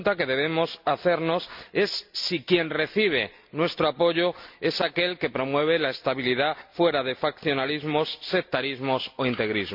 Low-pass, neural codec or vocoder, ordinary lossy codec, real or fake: 5.4 kHz; none; MP3, 48 kbps; real